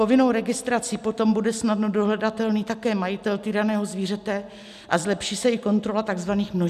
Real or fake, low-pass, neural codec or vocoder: real; 14.4 kHz; none